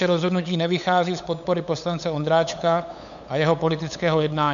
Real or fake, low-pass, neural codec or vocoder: fake; 7.2 kHz; codec, 16 kHz, 8 kbps, FunCodec, trained on LibriTTS, 25 frames a second